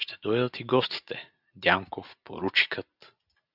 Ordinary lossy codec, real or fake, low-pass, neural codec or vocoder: Opus, 64 kbps; real; 5.4 kHz; none